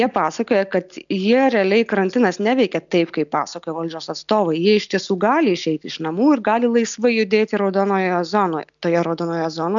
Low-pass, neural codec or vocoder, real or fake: 7.2 kHz; none; real